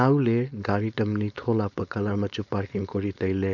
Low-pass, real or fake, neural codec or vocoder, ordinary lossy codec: 7.2 kHz; fake; codec, 16 kHz, 4.8 kbps, FACodec; none